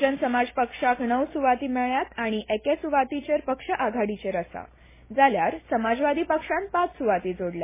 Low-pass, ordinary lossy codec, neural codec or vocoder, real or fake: 3.6 kHz; MP3, 16 kbps; none; real